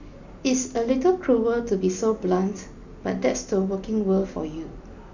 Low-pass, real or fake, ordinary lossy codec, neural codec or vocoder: 7.2 kHz; real; none; none